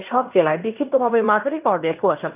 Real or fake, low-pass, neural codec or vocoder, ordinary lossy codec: fake; 3.6 kHz; codec, 16 kHz in and 24 kHz out, 0.9 kbps, LongCat-Audio-Codec, fine tuned four codebook decoder; none